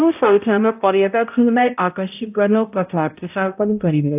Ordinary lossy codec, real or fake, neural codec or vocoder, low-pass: AAC, 32 kbps; fake; codec, 16 kHz, 0.5 kbps, X-Codec, HuBERT features, trained on balanced general audio; 3.6 kHz